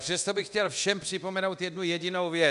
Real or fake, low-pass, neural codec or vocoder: fake; 10.8 kHz; codec, 24 kHz, 0.9 kbps, DualCodec